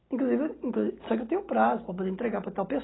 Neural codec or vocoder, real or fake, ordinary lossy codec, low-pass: none; real; AAC, 16 kbps; 7.2 kHz